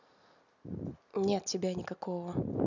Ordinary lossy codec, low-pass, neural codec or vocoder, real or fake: none; 7.2 kHz; none; real